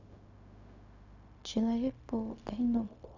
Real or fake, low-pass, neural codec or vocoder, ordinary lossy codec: fake; 7.2 kHz; codec, 16 kHz, 0.4 kbps, LongCat-Audio-Codec; none